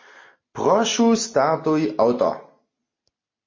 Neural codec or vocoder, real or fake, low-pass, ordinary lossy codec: none; real; 7.2 kHz; MP3, 32 kbps